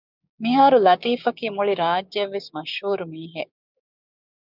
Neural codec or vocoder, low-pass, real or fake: codec, 16 kHz, 6 kbps, DAC; 5.4 kHz; fake